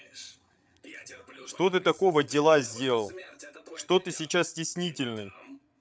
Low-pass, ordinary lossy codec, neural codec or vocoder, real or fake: none; none; codec, 16 kHz, 8 kbps, FreqCodec, larger model; fake